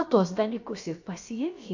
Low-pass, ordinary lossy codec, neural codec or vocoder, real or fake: 7.2 kHz; AAC, 48 kbps; codec, 16 kHz, about 1 kbps, DyCAST, with the encoder's durations; fake